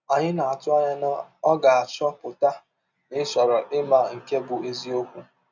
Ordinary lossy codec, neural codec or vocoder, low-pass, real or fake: none; none; 7.2 kHz; real